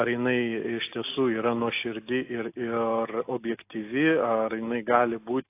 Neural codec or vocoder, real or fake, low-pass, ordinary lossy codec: none; real; 3.6 kHz; AAC, 24 kbps